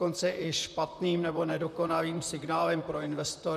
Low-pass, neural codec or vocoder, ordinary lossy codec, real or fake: 14.4 kHz; vocoder, 44.1 kHz, 128 mel bands, Pupu-Vocoder; MP3, 96 kbps; fake